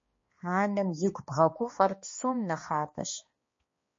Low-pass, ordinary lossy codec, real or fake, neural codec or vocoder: 7.2 kHz; MP3, 32 kbps; fake; codec, 16 kHz, 2 kbps, X-Codec, HuBERT features, trained on balanced general audio